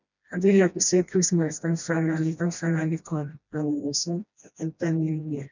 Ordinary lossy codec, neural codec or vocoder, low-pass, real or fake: none; codec, 16 kHz, 1 kbps, FreqCodec, smaller model; 7.2 kHz; fake